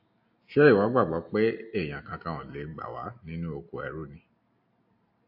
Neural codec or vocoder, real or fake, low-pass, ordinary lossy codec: none; real; 5.4 kHz; AAC, 48 kbps